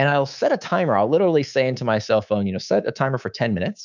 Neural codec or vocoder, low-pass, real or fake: codec, 24 kHz, 3.1 kbps, DualCodec; 7.2 kHz; fake